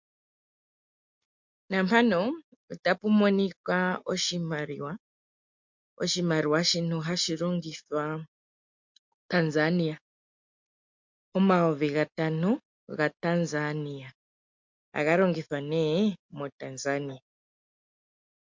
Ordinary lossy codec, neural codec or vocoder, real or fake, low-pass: MP3, 48 kbps; none; real; 7.2 kHz